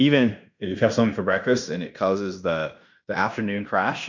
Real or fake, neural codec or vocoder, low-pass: fake; codec, 16 kHz in and 24 kHz out, 0.9 kbps, LongCat-Audio-Codec, fine tuned four codebook decoder; 7.2 kHz